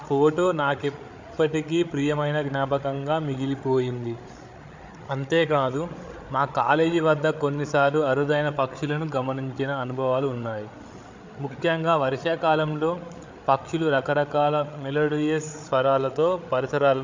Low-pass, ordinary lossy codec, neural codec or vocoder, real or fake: 7.2 kHz; MP3, 64 kbps; codec, 16 kHz, 8 kbps, FreqCodec, larger model; fake